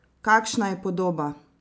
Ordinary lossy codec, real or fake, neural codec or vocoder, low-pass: none; real; none; none